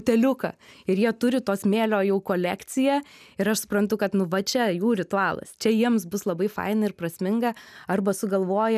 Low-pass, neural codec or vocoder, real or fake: 14.4 kHz; none; real